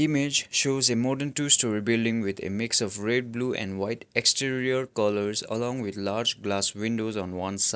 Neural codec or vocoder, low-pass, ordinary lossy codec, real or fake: none; none; none; real